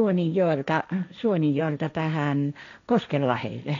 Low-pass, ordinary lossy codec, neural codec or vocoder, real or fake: 7.2 kHz; none; codec, 16 kHz, 1.1 kbps, Voila-Tokenizer; fake